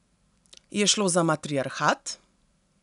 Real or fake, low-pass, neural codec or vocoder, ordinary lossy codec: real; 10.8 kHz; none; none